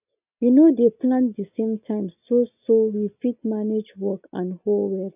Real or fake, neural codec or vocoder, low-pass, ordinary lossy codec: real; none; 3.6 kHz; none